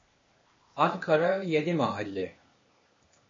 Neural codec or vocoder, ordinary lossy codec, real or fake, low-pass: codec, 16 kHz, 0.8 kbps, ZipCodec; MP3, 32 kbps; fake; 7.2 kHz